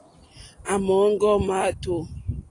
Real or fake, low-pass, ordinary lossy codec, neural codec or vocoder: real; 10.8 kHz; AAC, 32 kbps; none